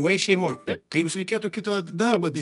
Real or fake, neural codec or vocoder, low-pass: fake; codec, 24 kHz, 0.9 kbps, WavTokenizer, medium music audio release; 10.8 kHz